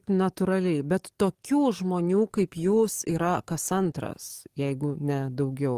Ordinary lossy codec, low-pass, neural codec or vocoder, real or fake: Opus, 24 kbps; 14.4 kHz; codec, 44.1 kHz, 7.8 kbps, DAC; fake